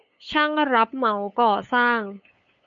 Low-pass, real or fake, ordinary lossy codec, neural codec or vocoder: 7.2 kHz; fake; MP3, 64 kbps; codec, 16 kHz, 8 kbps, FunCodec, trained on LibriTTS, 25 frames a second